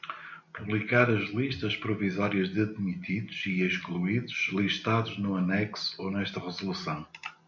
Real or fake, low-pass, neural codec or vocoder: real; 7.2 kHz; none